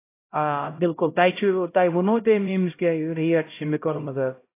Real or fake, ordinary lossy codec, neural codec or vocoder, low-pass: fake; AAC, 24 kbps; codec, 16 kHz, 0.5 kbps, X-Codec, HuBERT features, trained on LibriSpeech; 3.6 kHz